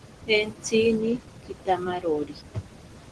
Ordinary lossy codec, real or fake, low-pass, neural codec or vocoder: Opus, 16 kbps; real; 10.8 kHz; none